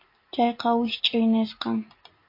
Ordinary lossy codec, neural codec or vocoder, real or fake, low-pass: MP3, 32 kbps; none; real; 5.4 kHz